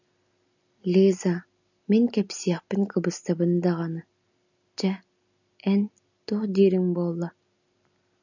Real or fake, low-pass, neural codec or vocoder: real; 7.2 kHz; none